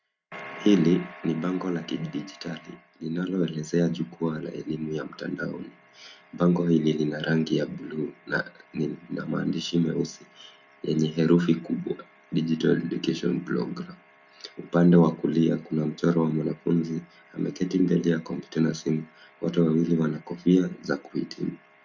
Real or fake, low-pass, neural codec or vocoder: real; 7.2 kHz; none